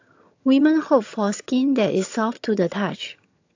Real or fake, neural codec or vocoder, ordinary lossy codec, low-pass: fake; vocoder, 22.05 kHz, 80 mel bands, HiFi-GAN; AAC, 48 kbps; 7.2 kHz